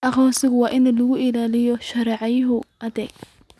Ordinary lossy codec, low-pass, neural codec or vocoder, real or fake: none; none; none; real